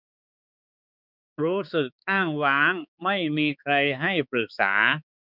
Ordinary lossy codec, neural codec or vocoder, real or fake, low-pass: none; codec, 16 kHz, 4 kbps, X-Codec, HuBERT features, trained on balanced general audio; fake; 5.4 kHz